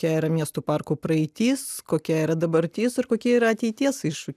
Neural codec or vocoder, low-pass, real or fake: none; 14.4 kHz; real